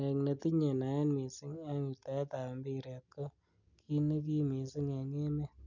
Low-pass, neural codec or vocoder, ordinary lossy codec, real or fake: 7.2 kHz; none; none; real